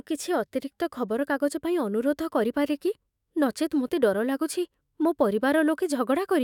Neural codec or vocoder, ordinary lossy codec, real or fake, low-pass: autoencoder, 48 kHz, 128 numbers a frame, DAC-VAE, trained on Japanese speech; none; fake; 19.8 kHz